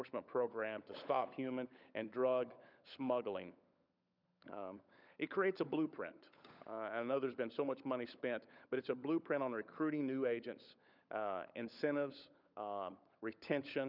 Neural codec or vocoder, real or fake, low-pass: codec, 16 kHz, 16 kbps, FunCodec, trained on LibriTTS, 50 frames a second; fake; 5.4 kHz